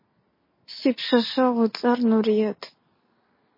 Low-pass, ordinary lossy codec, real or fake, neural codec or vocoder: 5.4 kHz; MP3, 24 kbps; real; none